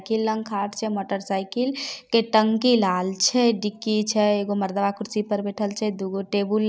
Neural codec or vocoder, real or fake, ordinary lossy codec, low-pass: none; real; none; none